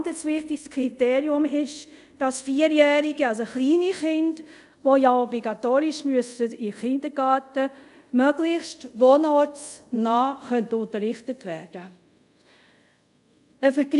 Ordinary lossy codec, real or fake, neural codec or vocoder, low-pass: none; fake; codec, 24 kHz, 0.5 kbps, DualCodec; 10.8 kHz